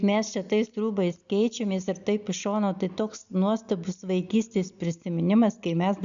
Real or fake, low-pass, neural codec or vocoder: real; 7.2 kHz; none